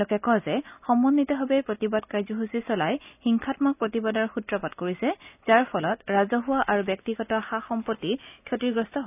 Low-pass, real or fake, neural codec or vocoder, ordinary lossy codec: 3.6 kHz; real; none; none